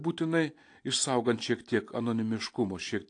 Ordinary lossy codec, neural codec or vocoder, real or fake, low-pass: AAC, 48 kbps; none; real; 9.9 kHz